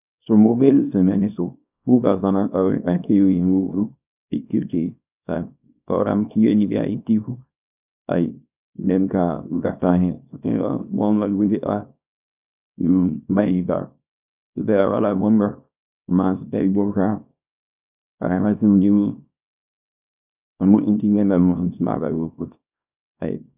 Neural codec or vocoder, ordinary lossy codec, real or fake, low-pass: codec, 24 kHz, 0.9 kbps, WavTokenizer, small release; none; fake; 3.6 kHz